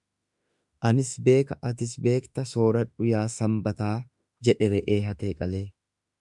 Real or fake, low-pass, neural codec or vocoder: fake; 10.8 kHz; autoencoder, 48 kHz, 32 numbers a frame, DAC-VAE, trained on Japanese speech